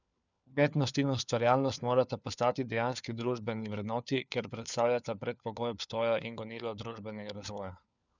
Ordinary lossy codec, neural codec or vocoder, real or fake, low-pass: none; codec, 16 kHz, 4 kbps, FunCodec, trained on LibriTTS, 50 frames a second; fake; 7.2 kHz